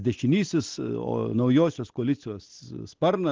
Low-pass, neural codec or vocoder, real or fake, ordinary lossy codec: 7.2 kHz; none; real; Opus, 16 kbps